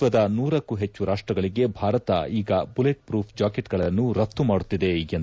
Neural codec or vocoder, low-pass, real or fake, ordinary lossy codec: none; none; real; none